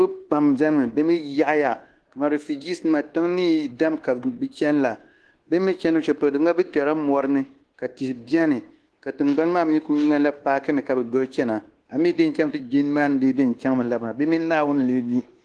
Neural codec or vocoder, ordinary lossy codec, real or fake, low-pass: codec, 24 kHz, 1.2 kbps, DualCodec; Opus, 16 kbps; fake; 10.8 kHz